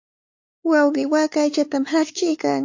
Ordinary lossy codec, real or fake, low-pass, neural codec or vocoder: AAC, 48 kbps; fake; 7.2 kHz; codec, 16 kHz, 4 kbps, X-Codec, WavLM features, trained on Multilingual LibriSpeech